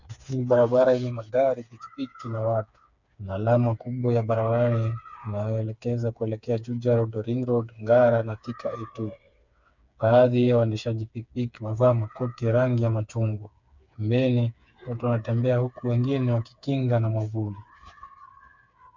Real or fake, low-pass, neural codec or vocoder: fake; 7.2 kHz; codec, 16 kHz, 4 kbps, FreqCodec, smaller model